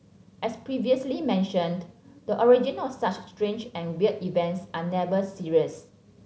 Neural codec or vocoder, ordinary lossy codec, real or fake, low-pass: none; none; real; none